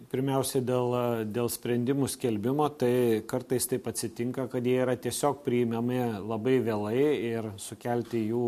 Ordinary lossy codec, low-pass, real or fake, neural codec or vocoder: MP3, 64 kbps; 14.4 kHz; real; none